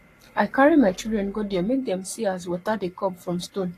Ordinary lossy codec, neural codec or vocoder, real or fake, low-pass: AAC, 48 kbps; codec, 44.1 kHz, 7.8 kbps, Pupu-Codec; fake; 14.4 kHz